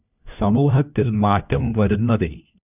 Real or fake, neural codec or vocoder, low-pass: fake; codec, 16 kHz, 1 kbps, FunCodec, trained on LibriTTS, 50 frames a second; 3.6 kHz